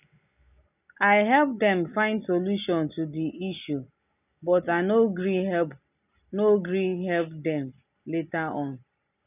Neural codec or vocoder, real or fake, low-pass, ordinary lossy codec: none; real; 3.6 kHz; none